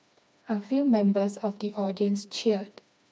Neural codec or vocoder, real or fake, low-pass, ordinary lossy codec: codec, 16 kHz, 2 kbps, FreqCodec, smaller model; fake; none; none